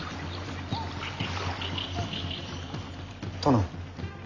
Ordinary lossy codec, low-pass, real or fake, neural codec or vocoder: none; 7.2 kHz; real; none